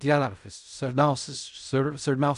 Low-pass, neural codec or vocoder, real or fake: 10.8 kHz; codec, 16 kHz in and 24 kHz out, 0.4 kbps, LongCat-Audio-Codec, fine tuned four codebook decoder; fake